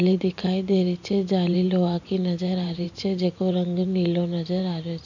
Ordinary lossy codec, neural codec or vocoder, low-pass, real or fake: none; vocoder, 44.1 kHz, 128 mel bands every 512 samples, BigVGAN v2; 7.2 kHz; fake